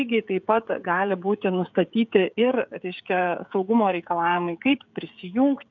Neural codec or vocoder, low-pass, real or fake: codec, 16 kHz, 16 kbps, FreqCodec, smaller model; 7.2 kHz; fake